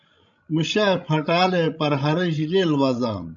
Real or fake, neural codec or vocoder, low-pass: fake; codec, 16 kHz, 16 kbps, FreqCodec, larger model; 7.2 kHz